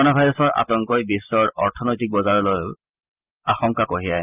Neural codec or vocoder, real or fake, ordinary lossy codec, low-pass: none; real; Opus, 24 kbps; 3.6 kHz